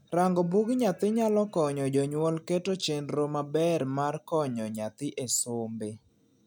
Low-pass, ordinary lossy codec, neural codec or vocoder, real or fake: none; none; none; real